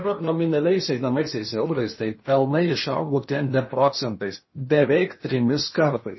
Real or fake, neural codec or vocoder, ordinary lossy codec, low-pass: fake; codec, 16 kHz in and 24 kHz out, 0.8 kbps, FocalCodec, streaming, 65536 codes; MP3, 24 kbps; 7.2 kHz